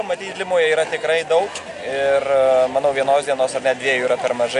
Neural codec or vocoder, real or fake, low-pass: none; real; 10.8 kHz